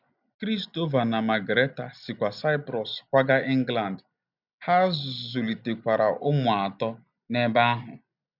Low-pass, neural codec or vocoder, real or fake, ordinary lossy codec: 5.4 kHz; none; real; none